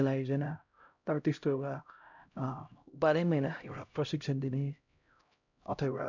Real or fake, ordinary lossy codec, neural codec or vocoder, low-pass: fake; none; codec, 16 kHz, 0.5 kbps, X-Codec, HuBERT features, trained on LibriSpeech; 7.2 kHz